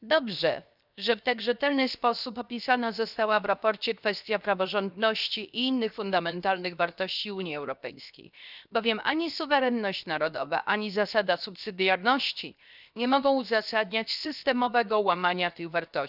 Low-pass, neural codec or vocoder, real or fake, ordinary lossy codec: 5.4 kHz; codec, 16 kHz, 0.7 kbps, FocalCodec; fake; none